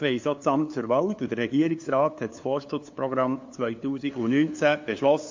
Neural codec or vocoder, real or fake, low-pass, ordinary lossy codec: codec, 16 kHz, 2 kbps, FunCodec, trained on LibriTTS, 25 frames a second; fake; 7.2 kHz; MP3, 48 kbps